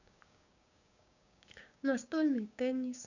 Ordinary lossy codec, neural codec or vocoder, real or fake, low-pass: none; codec, 16 kHz, 6 kbps, DAC; fake; 7.2 kHz